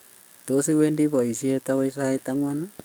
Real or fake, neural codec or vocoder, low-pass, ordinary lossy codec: fake; codec, 44.1 kHz, 7.8 kbps, DAC; none; none